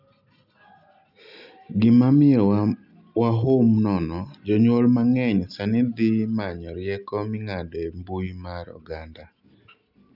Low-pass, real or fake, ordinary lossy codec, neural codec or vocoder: 5.4 kHz; real; none; none